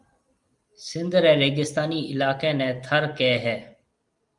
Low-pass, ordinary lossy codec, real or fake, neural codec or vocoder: 10.8 kHz; Opus, 32 kbps; real; none